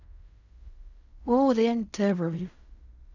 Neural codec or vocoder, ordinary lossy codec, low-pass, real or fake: codec, 16 kHz in and 24 kHz out, 0.4 kbps, LongCat-Audio-Codec, fine tuned four codebook decoder; none; 7.2 kHz; fake